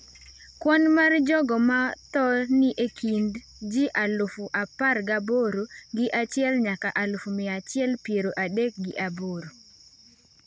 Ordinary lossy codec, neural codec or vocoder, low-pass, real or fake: none; none; none; real